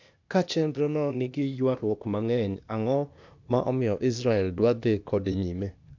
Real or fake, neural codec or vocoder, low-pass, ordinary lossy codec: fake; codec, 16 kHz, 0.8 kbps, ZipCodec; 7.2 kHz; MP3, 64 kbps